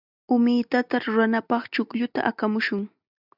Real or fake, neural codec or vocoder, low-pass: real; none; 5.4 kHz